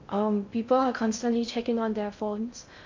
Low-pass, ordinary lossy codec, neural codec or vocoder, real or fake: 7.2 kHz; MP3, 48 kbps; codec, 16 kHz in and 24 kHz out, 0.6 kbps, FocalCodec, streaming, 2048 codes; fake